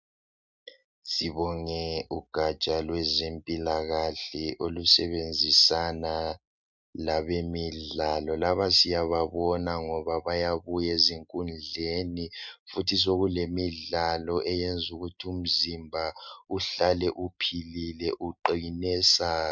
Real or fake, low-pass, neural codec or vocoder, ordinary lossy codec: real; 7.2 kHz; none; MP3, 64 kbps